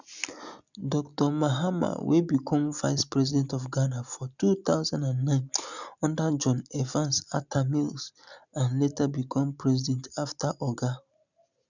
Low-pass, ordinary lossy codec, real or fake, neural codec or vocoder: 7.2 kHz; none; real; none